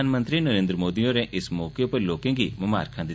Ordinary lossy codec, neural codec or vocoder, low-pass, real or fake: none; none; none; real